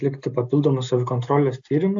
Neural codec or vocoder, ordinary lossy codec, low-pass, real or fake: none; AAC, 64 kbps; 7.2 kHz; real